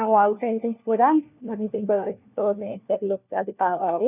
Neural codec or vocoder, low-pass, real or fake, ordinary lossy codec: codec, 16 kHz, 1 kbps, FunCodec, trained on LibriTTS, 50 frames a second; 3.6 kHz; fake; none